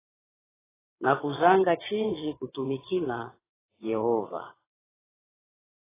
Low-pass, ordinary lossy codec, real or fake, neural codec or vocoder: 3.6 kHz; AAC, 16 kbps; fake; vocoder, 22.05 kHz, 80 mel bands, WaveNeXt